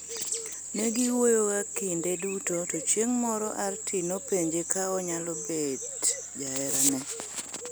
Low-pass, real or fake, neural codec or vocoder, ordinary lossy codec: none; real; none; none